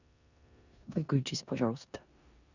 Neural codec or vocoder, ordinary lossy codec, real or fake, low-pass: codec, 16 kHz in and 24 kHz out, 0.9 kbps, LongCat-Audio-Codec, four codebook decoder; none; fake; 7.2 kHz